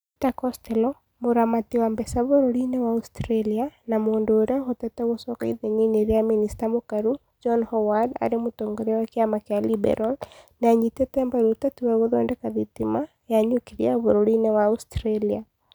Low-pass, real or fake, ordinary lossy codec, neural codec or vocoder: none; real; none; none